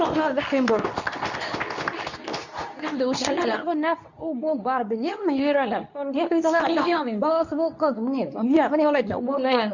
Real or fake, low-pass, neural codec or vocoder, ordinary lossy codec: fake; 7.2 kHz; codec, 24 kHz, 0.9 kbps, WavTokenizer, medium speech release version 2; none